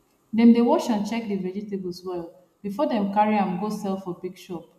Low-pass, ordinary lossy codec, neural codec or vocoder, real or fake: 14.4 kHz; none; none; real